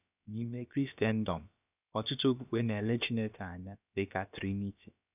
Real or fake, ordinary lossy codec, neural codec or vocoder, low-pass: fake; none; codec, 16 kHz, about 1 kbps, DyCAST, with the encoder's durations; 3.6 kHz